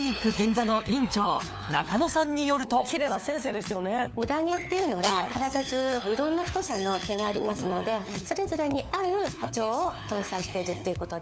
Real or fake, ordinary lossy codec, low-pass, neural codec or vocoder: fake; none; none; codec, 16 kHz, 4 kbps, FunCodec, trained on LibriTTS, 50 frames a second